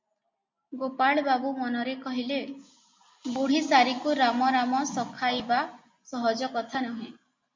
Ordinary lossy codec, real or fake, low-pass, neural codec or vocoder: MP3, 48 kbps; real; 7.2 kHz; none